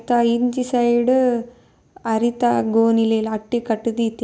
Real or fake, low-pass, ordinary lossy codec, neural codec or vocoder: real; none; none; none